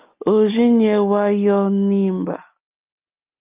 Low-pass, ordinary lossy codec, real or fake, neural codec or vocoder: 3.6 kHz; Opus, 32 kbps; real; none